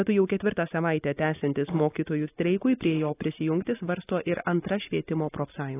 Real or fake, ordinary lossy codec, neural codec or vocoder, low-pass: real; AAC, 16 kbps; none; 3.6 kHz